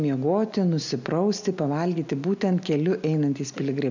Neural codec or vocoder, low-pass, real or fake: none; 7.2 kHz; real